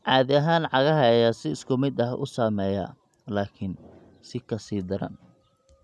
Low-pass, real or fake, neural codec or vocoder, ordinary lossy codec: none; real; none; none